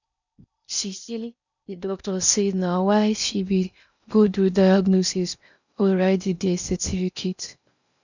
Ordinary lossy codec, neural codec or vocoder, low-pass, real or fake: none; codec, 16 kHz in and 24 kHz out, 0.6 kbps, FocalCodec, streaming, 4096 codes; 7.2 kHz; fake